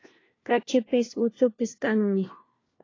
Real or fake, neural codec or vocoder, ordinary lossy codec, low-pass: fake; codec, 16 kHz, 1 kbps, FunCodec, trained on LibriTTS, 50 frames a second; AAC, 32 kbps; 7.2 kHz